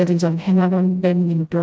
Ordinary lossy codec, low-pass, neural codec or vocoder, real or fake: none; none; codec, 16 kHz, 0.5 kbps, FreqCodec, smaller model; fake